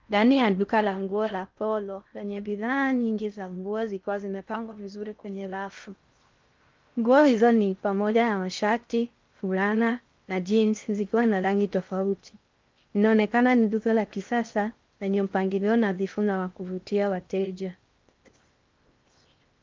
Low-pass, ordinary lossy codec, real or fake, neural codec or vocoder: 7.2 kHz; Opus, 32 kbps; fake; codec, 16 kHz in and 24 kHz out, 0.6 kbps, FocalCodec, streaming, 4096 codes